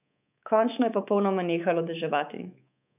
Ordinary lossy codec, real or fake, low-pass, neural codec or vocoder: none; fake; 3.6 kHz; codec, 24 kHz, 3.1 kbps, DualCodec